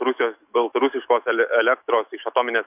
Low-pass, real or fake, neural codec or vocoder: 3.6 kHz; fake; autoencoder, 48 kHz, 128 numbers a frame, DAC-VAE, trained on Japanese speech